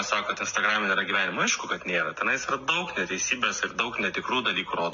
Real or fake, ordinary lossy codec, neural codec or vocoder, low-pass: real; MP3, 48 kbps; none; 7.2 kHz